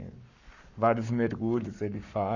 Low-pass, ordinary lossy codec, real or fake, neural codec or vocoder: 7.2 kHz; none; fake; codec, 44.1 kHz, 7.8 kbps, Pupu-Codec